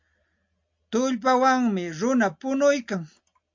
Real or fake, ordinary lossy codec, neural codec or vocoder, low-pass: real; MP3, 48 kbps; none; 7.2 kHz